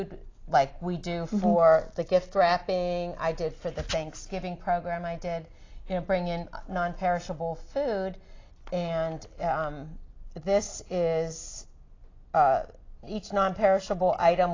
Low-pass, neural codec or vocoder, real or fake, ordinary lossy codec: 7.2 kHz; none; real; AAC, 32 kbps